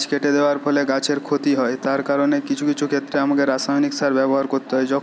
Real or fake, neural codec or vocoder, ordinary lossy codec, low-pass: real; none; none; none